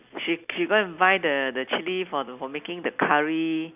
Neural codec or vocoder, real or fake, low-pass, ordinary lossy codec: none; real; 3.6 kHz; none